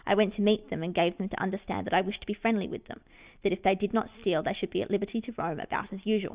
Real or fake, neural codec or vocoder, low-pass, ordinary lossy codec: real; none; 3.6 kHz; Opus, 64 kbps